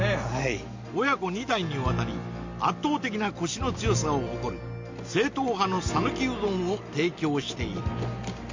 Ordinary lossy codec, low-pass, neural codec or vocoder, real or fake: MP3, 48 kbps; 7.2 kHz; none; real